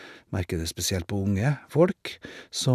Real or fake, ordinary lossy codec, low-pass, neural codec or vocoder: real; none; 14.4 kHz; none